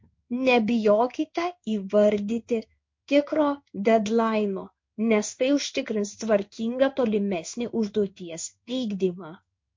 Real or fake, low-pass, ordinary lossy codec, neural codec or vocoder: fake; 7.2 kHz; MP3, 48 kbps; codec, 16 kHz in and 24 kHz out, 1 kbps, XY-Tokenizer